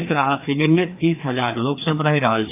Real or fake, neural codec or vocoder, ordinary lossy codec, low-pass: fake; codec, 16 kHz, 2 kbps, FreqCodec, larger model; AAC, 32 kbps; 3.6 kHz